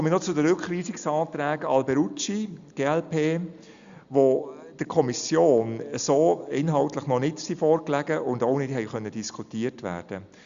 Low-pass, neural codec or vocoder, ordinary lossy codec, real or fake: 7.2 kHz; none; Opus, 64 kbps; real